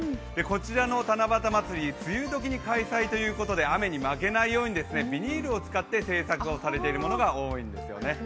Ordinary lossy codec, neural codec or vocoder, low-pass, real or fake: none; none; none; real